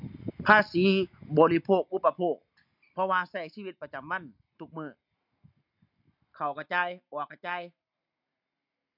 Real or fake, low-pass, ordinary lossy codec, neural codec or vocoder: real; 5.4 kHz; none; none